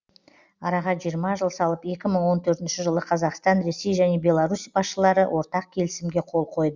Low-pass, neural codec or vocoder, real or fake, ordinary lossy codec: 7.2 kHz; none; real; Opus, 64 kbps